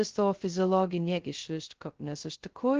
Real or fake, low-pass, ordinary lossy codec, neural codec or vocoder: fake; 7.2 kHz; Opus, 16 kbps; codec, 16 kHz, 0.2 kbps, FocalCodec